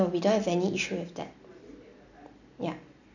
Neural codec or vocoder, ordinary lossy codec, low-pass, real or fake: none; none; 7.2 kHz; real